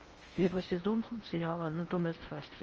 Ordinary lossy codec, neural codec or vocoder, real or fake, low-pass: Opus, 24 kbps; codec, 16 kHz in and 24 kHz out, 0.8 kbps, FocalCodec, streaming, 65536 codes; fake; 7.2 kHz